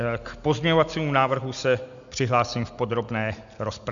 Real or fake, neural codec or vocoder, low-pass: real; none; 7.2 kHz